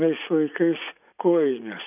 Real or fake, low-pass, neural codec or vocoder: fake; 3.6 kHz; vocoder, 44.1 kHz, 80 mel bands, Vocos